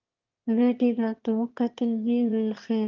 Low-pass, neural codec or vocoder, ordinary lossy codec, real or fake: 7.2 kHz; autoencoder, 22.05 kHz, a latent of 192 numbers a frame, VITS, trained on one speaker; Opus, 24 kbps; fake